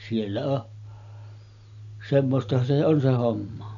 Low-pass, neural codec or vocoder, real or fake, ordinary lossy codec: 7.2 kHz; none; real; none